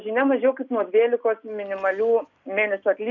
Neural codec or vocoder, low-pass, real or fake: none; 7.2 kHz; real